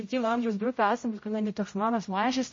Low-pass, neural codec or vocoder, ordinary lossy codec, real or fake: 7.2 kHz; codec, 16 kHz, 0.5 kbps, X-Codec, HuBERT features, trained on general audio; MP3, 32 kbps; fake